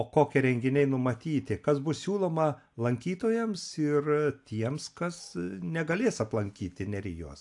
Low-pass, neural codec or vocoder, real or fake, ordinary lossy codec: 10.8 kHz; none; real; AAC, 64 kbps